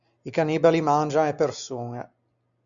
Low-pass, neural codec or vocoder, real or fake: 7.2 kHz; none; real